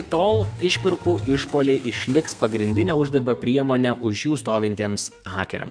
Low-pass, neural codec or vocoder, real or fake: 9.9 kHz; codec, 32 kHz, 1.9 kbps, SNAC; fake